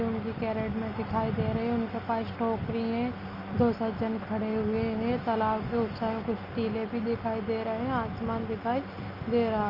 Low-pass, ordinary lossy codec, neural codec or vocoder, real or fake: 5.4 kHz; Opus, 32 kbps; none; real